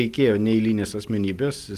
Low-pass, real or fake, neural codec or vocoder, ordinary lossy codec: 14.4 kHz; real; none; Opus, 24 kbps